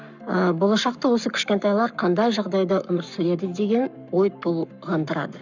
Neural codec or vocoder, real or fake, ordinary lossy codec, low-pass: codec, 44.1 kHz, 7.8 kbps, Pupu-Codec; fake; none; 7.2 kHz